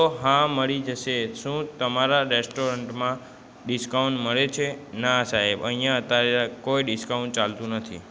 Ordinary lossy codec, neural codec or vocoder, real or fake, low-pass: none; none; real; none